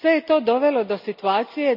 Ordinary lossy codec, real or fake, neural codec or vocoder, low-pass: none; real; none; 5.4 kHz